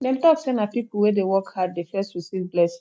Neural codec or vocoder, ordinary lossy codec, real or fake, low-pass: none; none; real; none